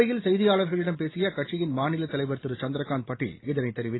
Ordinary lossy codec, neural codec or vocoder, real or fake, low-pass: AAC, 16 kbps; none; real; 7.2 kHz